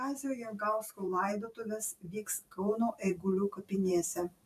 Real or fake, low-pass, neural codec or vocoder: fake; 14.4 kHz; vocoder, 44.1 kHz, 128 mel bands every 512 samples, BigVGAN v2